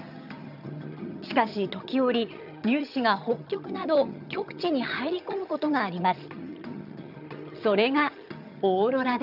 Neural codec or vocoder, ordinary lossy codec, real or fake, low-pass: vocoder, 22.05 kHz, 80 mel bands, HiFi-GAN; none; fake; 5.4 kHz